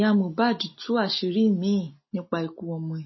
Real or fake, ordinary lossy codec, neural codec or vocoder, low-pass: real; MP3, 24 kbps; none; 7.2 kHz